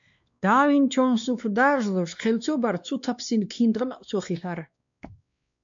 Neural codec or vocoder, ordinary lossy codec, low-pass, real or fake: codec, 16 kHz, 2 kbps, X-Codec, WavLM features, trained on Multilingual LibriSpeech; MP3, 64 kbps; 7.2 kHz; fake